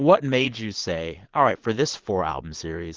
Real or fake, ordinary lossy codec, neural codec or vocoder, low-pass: fake; Opus, 16 kbps; vocoder, 22.05 kHz, 80 mel bands, Vocos; 7.2 kHz